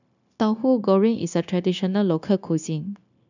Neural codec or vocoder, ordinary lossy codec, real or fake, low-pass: codec, 16 kHz, 0.9 kbps, LongCat-Audio-Codec; none; fake; 7.2 kHz